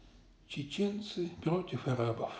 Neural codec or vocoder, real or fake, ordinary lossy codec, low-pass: none; real; none; none